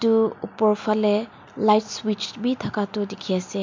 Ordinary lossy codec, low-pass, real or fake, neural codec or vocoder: MP3, 48 kbps; 7.2 kHz; real; none